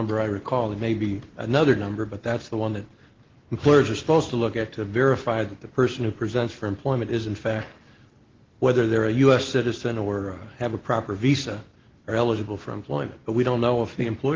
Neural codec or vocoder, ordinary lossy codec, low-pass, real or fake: none; Opus, 16 kbps; 7.2 kHz; real